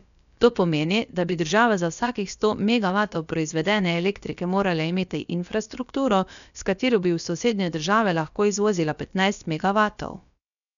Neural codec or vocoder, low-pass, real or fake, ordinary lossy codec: codec, 16 kHz, about 1 kbps, DyCAST, with the encoder's durations; 7.2 kHz; fake; none